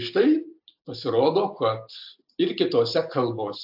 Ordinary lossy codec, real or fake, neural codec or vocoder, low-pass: AAC, 48 kbps; real; none; 5.4 kHz